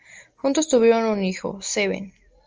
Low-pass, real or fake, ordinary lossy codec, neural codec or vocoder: 7.2 kHz; real; Opus, 24 kbps; none